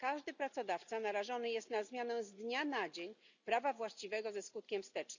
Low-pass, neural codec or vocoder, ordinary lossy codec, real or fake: 7.2 kHz; none; none; real